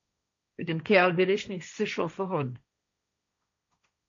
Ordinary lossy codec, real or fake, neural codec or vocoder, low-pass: MP3, 64 kbps; fake; codec, 16 kHz, 1.1 kbps, Voila-Tokenizer; 7.2 kHz